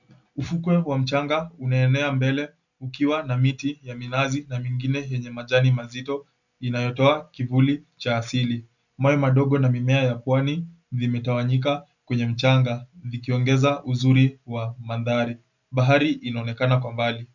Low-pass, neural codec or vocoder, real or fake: 7.2 kHz; none; real